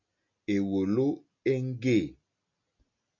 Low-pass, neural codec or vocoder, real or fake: 7.2 kHz; none; real